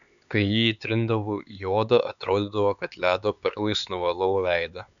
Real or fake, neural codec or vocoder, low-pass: fake; codec, 16 kHz, 4 kbps, X-Codec, HuBERT features, trained on LibriSpeech; 7.2 kHz